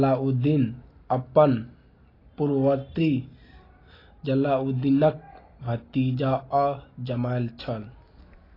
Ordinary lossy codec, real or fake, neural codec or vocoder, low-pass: AAC, 24 kbps; real; none; 5.4 kHz